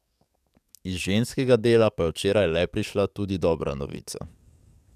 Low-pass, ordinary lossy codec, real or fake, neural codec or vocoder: 14.4 kHz; none; fake; codec, 44.1 kHz, 7.8 kbps, DAC